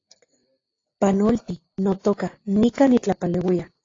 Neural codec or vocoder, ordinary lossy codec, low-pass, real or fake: none; AAC, 32 kbps; 7.2 kHz; real